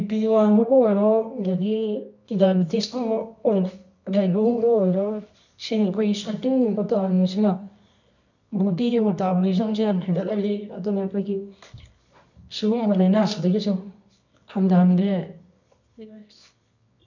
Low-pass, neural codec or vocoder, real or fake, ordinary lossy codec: 7.2 kHz; codec, 24 kHz, 0.9 kbps, WavTokenizer, medium music audio release; fake; none